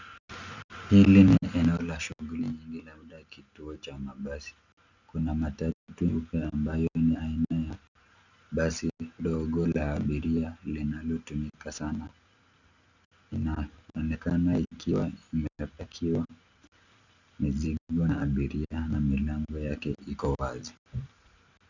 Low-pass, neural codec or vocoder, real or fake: 7.2 kHz; none; real